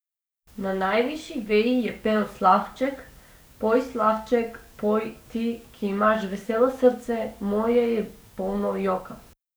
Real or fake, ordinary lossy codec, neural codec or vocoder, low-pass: fake; none; codec, 44.1 kHz, 7.8 kbps, DAC; none